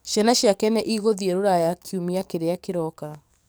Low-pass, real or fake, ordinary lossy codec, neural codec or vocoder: none; fake; none; codec, 44.1 kHz, 7.8 kbps, DAC